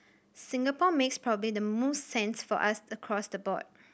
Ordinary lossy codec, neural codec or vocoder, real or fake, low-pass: none; none; real; none